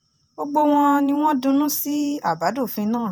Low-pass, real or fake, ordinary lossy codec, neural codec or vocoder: none; fake; none; vocoder, 48 kHz, 128 mel bands, Vocos